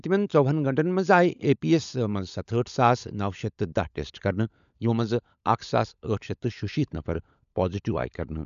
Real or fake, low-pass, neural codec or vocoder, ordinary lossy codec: fake; 7.2 kHz; codec, 16 kHz, 16 kbps, FunCodec, trained on LibriTTS, 50 frames a second; none